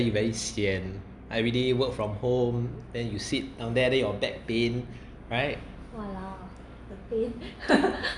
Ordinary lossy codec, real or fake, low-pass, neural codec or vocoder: none; real; 10.8 kHz; none